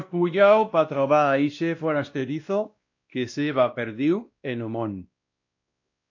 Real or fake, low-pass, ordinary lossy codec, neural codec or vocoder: fake; 7.2 kHz; AAC, 48 kbps; codec, 16 kHz, 1 kbps, X-Codec, WavLM features, trained on Multilingual LibriSpeech